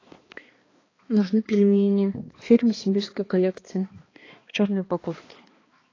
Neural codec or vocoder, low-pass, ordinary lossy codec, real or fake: codec, 16 kHz, 2 kbps, X-Codec, HuBERT features, trained on balanced general audio; 7.2 kHz; AAC, 32 kbps; fake